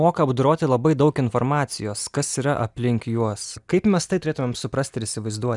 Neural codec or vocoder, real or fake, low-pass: none; real; 10.8 kHz